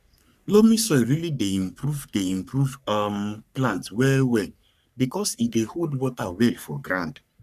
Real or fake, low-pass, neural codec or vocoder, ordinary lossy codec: fake; 14.4 kHz; codec, 44.1 kHz, 3.4 kbps, Pupu-Codec; none